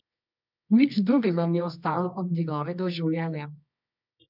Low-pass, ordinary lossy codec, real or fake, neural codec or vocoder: 5.4 kHz; none; fake; codec, 24 kHz, 0.9 kbps, WavTokenizer, medium music audio release